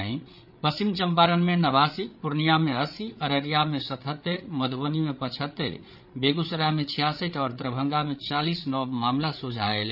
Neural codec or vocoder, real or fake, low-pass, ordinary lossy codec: codec, 16 kHz, 8 kbps, FreqCodec, larger model; fake; 5.4 kHz; none